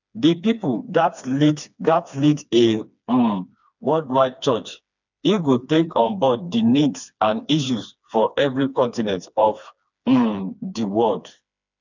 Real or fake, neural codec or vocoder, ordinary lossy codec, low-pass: fake; codec, 16 kHz, 2 kbps, FreqCodec, smaller model; none; 7.2 kHz